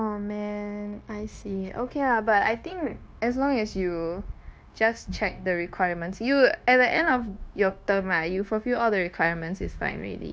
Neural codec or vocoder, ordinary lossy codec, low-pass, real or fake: codec, 16 kHz, 0.9 kbps, LongCat-Audio-Codec; none; none; fake